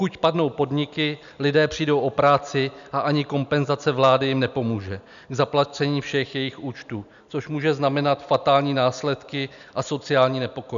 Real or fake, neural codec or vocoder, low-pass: real; none; 7.2 kHz